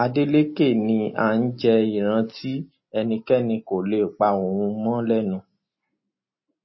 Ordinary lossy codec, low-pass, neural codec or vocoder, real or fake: MP3, 24 kbps; 7.2 kHz; none; real